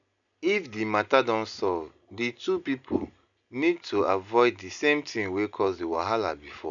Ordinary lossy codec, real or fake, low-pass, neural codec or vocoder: none; real; 7.2 kHz; none